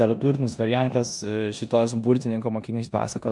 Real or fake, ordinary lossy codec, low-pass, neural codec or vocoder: fake; MP3, 96 kbps; 10.8 kHz; codec, 16 kHz in and 24 kHz out, 0.9 kbps, LongCat-Audio-Codec, four codebook decoder